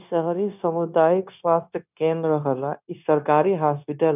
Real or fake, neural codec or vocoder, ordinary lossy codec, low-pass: fake; codec, 16 kHz, 0.9 kbps, LongCat-Audio-Codec; none; 3.6 kHz